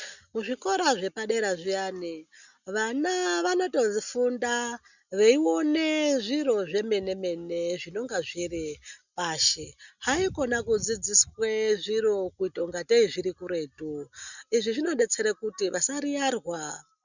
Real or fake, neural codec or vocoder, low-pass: real; none; 7.2 kHz